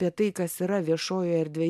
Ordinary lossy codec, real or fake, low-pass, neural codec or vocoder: MP3, 96 kbps; real; 14.4 kHz; none